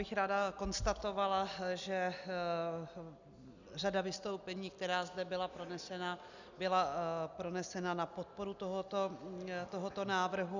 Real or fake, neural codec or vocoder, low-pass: real; none; 7.2 kHz